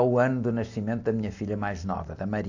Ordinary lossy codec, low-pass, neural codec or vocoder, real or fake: AAC, 48 kbps; 7.2 kHz; none; real